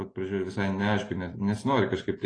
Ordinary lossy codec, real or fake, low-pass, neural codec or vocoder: AAC, 32 kbps; real; 9.9 kHz; none